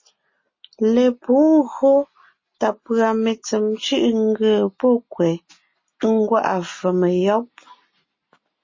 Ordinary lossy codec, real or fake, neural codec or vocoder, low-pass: MP3, 32 kbps; real; none; 7.2 kHz